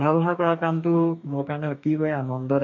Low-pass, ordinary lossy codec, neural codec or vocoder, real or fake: 7.2 kHz; MP3, 64 kbps; codec, 44.1 kHz, 2.6 kbps, DAC; fake